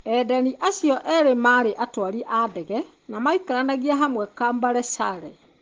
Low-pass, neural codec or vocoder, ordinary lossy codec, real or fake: 7.2 kHz; none; Opus, 16 kbps; real